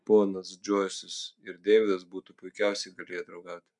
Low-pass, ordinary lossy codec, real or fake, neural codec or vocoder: 10.8 kHz; MP3, 64 kbps; real; none